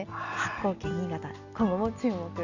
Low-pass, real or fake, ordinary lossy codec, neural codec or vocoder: 7.2 kHz; real; none; none